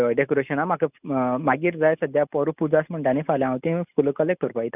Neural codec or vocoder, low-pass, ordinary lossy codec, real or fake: none; 3.6 kHz; none; real